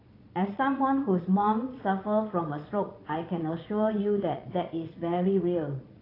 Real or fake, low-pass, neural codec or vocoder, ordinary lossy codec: fake; 5.4 kHz; vocoder, 22.05 kHz, 80 mel bands, Vocos; AAC, 24 kbps